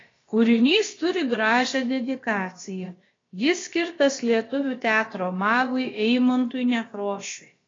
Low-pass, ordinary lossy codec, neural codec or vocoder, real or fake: 7.2 kHz; AAC, 32 kbps; codec, 16 kHz, about 1 kbps, DyCAST, with the encoder's durations; fake